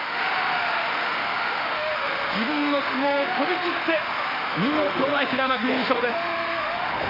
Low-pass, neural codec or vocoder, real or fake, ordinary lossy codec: 5.4 kHz; autoencoder, 48 kHz, 32 numbers a frame, DAC-VAE, trained on Japanese speech; fake; Opus, 64 kbps